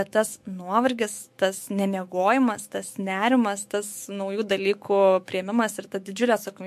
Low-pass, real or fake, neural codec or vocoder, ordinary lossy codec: 14.4 kHz; fake; autoencoder, 48 kHz, 128 numbers a frame, DAC-VAE, trained on Japanese speech; MP3, 64 kbps